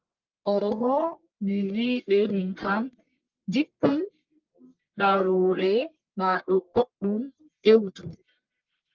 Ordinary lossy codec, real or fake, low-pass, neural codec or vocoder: Opus, 24 kbps; fake; 7.2 kHz; codec, 44.1 kHz, 1.7 kbps, Pupu-Codec